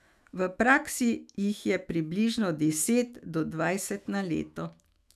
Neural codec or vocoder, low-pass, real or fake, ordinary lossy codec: autoencoder, 48 kHz, 128 numbers a frame, DAC-VAE, trained on Japanese speech; 14.4 kHz; fake; none